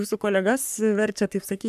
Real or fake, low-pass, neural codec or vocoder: fake; 14.4 kHz; codec, 44.1 kHz, 3.4 kbps, Pupu-Codec